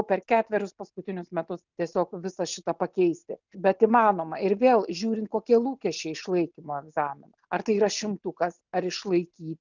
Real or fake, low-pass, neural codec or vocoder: real; 7.2 kHz; none